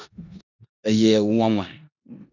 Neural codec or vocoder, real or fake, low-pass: codec, 16 kHz in and 24 kHz out, 0.9 kbps, LongCat-Audio-Codec, four codebook decoder; fake; 7.2 kHz